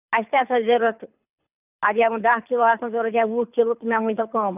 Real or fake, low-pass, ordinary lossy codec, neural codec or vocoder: fake; 3.6 kHz; none; codec, 24 kHz, 6 kbps, HILCodec